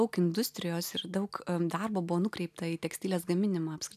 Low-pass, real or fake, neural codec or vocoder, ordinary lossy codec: 14.4 kHz; real; none; AAC, 96 kbps